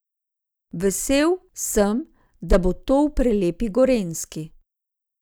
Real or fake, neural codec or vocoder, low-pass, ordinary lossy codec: real; none; none; none